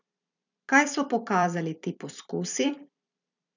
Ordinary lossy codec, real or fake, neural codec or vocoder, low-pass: none; fake; vocoder, 44.1 kHz, 128 mel bands every 256 samples, BigVGAN v2; 7.2 kHz